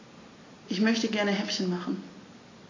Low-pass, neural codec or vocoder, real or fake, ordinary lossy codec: 7.2 kHz; none; real; AAC, 32 kbps